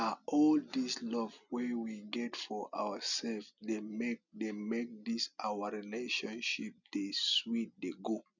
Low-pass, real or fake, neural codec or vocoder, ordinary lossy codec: 7.2 kHz; real; none; none